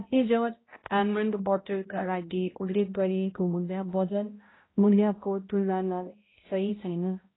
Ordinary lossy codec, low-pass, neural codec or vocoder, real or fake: AAC, 16 kbps; 7.2 kHz; codec, 16 kHz, 0.5 kbps, X-Codec, HuBERT features, trained on balanced general audio; fake